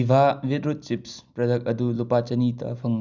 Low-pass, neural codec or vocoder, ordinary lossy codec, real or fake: 7.2 kHz; none; none; real